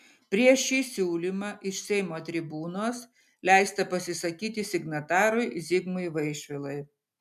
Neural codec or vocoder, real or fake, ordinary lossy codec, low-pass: none; real; MP3, 96 kbps; 14.4 kHz